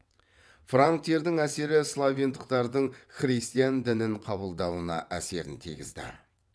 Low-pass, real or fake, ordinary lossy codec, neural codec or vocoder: none; fake; none; vocoder, 22.05 kHz, 80 mel bands, WaveNeXt